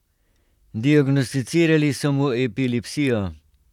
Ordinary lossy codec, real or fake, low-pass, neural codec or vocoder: none; fake; 19.8 kHz; vocoder, 44.1 kHz, 128 mel bands, Pupu-Vocoder